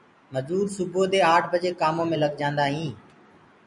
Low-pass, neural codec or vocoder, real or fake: 10.8 kHz; none; real